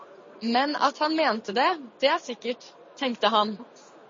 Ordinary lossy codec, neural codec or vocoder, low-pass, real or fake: MP3, 32 kbps; none; 7.2 kHz; real